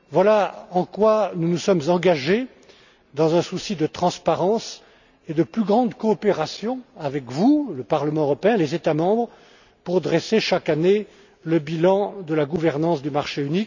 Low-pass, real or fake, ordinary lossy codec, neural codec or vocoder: 7.2 kHz; real; none; none